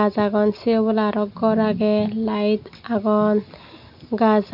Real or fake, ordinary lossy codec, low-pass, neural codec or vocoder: real; none; 5.4 kHz; none